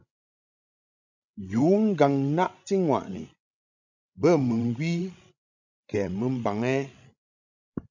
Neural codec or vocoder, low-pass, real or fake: codec, 16 kHz, 16 kbps, FreqCodec, larger model; 7.2 kHz; fake